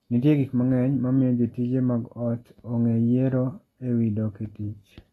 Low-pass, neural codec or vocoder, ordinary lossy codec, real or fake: 19.8 kHz; none; AAC, 32 kbps; real